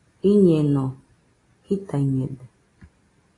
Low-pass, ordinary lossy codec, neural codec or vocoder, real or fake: 10.8 kHz; AAC, 32 kbps; none; real